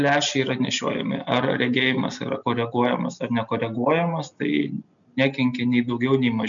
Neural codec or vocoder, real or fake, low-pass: none; real; 7.2 kHz